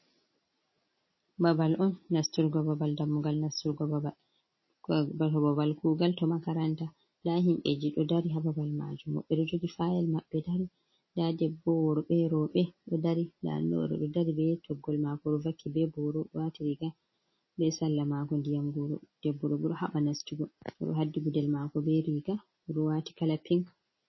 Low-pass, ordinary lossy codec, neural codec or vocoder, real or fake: 7.2 kHz; MP3, 24 kbps; none; real